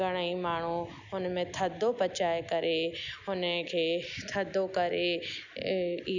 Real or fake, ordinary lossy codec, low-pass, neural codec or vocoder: real; none; 7.2 kHz; none